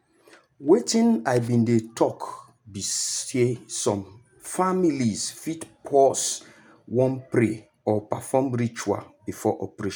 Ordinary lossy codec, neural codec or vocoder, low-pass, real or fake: none; none; none; real